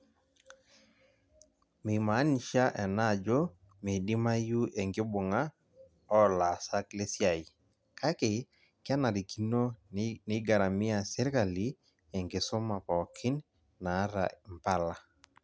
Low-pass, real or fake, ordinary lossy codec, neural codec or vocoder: none; real; none; none